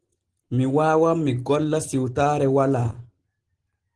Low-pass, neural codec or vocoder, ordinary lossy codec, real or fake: 10.8 kHz; none; Opus, 16 kbps; real